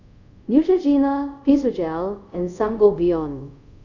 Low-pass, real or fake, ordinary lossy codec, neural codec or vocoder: 7.2 kHz; fake; none; codec, 24 kHz, 0.5 kbps, DualCodec